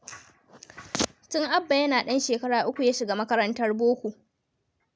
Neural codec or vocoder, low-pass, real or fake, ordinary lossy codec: none; none; real; none